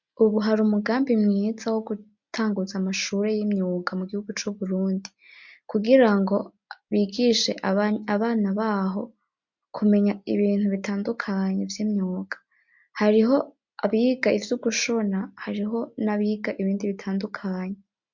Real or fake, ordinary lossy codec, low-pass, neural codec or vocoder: real; MP3, 64 kbps; 7.2 kHz; none